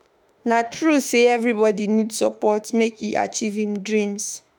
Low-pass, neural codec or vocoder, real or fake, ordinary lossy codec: none; autoencoder, 48 kHz, 32 numbers a frame, DAC-VAE, trained on Japanese speech; fake; none